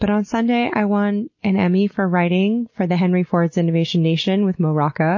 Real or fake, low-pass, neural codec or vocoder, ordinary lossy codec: real; 7.2 kHz; none; MP3, 32 kbps